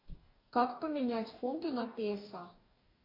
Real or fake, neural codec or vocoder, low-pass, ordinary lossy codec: fake; codec, 44.1 kHz, 2.6 kbps, DAC; 5.4 kHz; AAC, 32 kbps